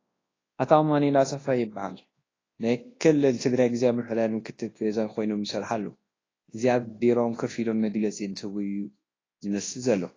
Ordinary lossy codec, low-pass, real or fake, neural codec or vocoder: AAC, 32 kbps; 7.2 kHz; fake; codec, 24 kHz, 0.9 kbps, WavTokenizer, large speech release